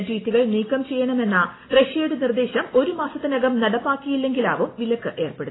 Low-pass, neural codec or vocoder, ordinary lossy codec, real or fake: 7.2 kHz; none; AAC, 16 kbps; real